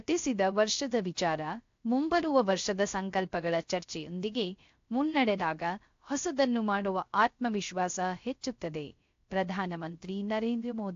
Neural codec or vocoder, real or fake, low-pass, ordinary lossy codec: codec, 16 kHz, 0.3 kbps, FocalCodec; fake; 7.2 kHz; AAC, 48 kbps